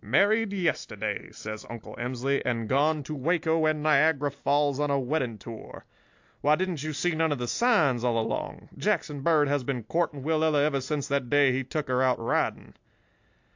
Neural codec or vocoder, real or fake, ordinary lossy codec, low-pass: none; real; AAC, 48 kbps; 7.2 kHz